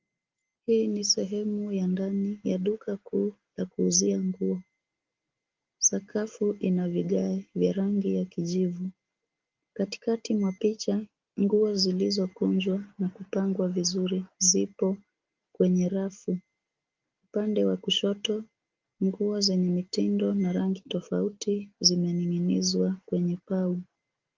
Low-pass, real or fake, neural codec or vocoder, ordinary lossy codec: 7.2 kHz; real; none; Opus, 24 kbps